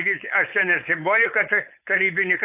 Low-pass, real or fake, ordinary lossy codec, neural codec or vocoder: 3.6 kHz; fake; AAC, 32 kbps; codec, 16 kHz, 4.8 kbps, FACodec